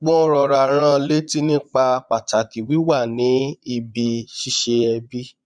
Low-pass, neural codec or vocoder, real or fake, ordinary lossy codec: 9.9 kHz; vocoder, 22.05 kHz, 80 mel bands, Vocos; fake; none